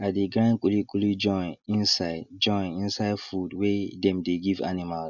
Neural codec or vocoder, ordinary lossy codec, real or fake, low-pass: none; none; real; 7.2 kHz